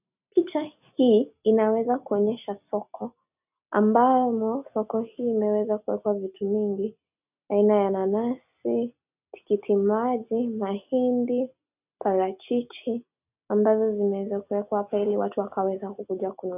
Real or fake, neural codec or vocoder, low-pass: real; none; 3.6 kHz